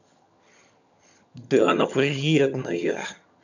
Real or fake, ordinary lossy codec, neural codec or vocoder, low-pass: fake; none; vocoder, 22.05 kHz, 80 mel bands, HiFi-GAN; 7.2 kHz